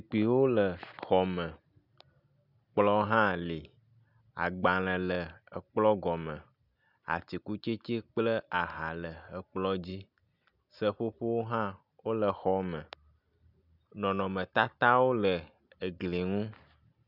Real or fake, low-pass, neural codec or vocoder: real; 5.4 kHz; none